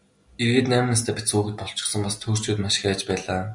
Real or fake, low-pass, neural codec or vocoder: real; 10.8 kHz; none